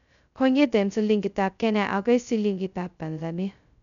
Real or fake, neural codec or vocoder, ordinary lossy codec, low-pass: fake; codec, 16 kHz, 0.2 kbps, FocalCodec; none; 7.2 kHz